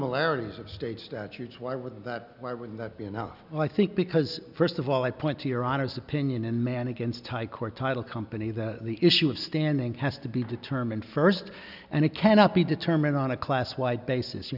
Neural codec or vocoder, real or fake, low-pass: none; real; 5.4 kHz